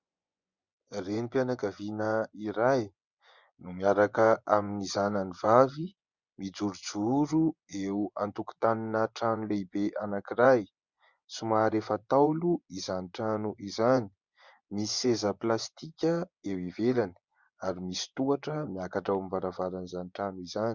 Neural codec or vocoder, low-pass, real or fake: vocoder, 24 kHz, 100 mel bands, Vocos; 7.2 kHz; fake